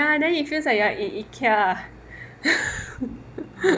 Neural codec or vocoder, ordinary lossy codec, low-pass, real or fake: none; none; none; real